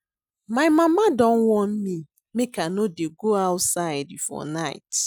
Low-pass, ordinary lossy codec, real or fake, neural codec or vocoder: none; none; real; none